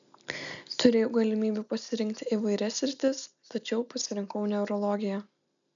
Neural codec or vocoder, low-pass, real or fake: none; 7.2 kHz; real